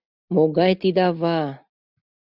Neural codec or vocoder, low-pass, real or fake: none; 5.4 kHz; real